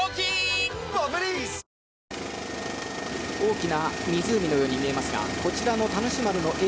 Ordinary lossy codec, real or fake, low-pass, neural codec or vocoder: none; real; none; none